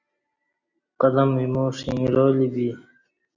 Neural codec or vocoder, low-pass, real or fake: none; 7.2 kHz; real